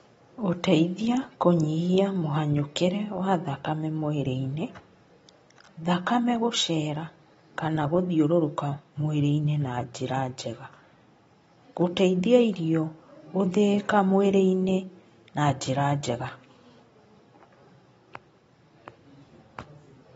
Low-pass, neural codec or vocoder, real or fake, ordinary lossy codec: 19.8 kHz; none; real; AAC, 24 kbps